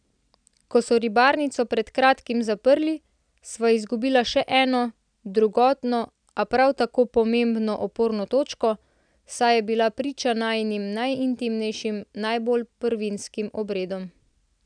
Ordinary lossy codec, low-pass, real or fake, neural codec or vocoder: none; 9.9 kHz; real; none